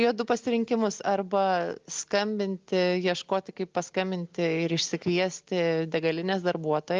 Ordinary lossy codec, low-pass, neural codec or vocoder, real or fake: Opus, 32 kbps; 7.2 kHz; none; real